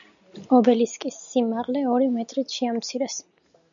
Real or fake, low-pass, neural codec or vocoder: real; 7.2 kHz; none